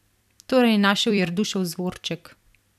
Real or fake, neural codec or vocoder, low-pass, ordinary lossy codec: fake; vocoder, 44.1 kHz, 128 mel bands every 256 samples, BigVGAN v2; 14.4 kHz; none